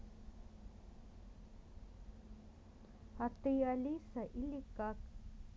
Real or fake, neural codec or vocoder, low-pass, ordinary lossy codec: real; none; none; none